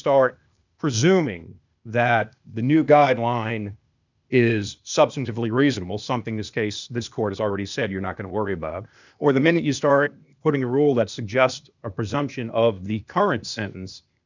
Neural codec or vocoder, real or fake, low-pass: codec, 16 kHz, 0.8 kbps, ZipCodec; fake; 7.2 kHz